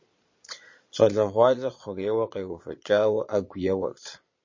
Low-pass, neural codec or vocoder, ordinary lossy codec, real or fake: 7.2 kHz; vocoder, 22.05 kHz, 80 mel bands, Vocos; MP3, 32 kbps; fake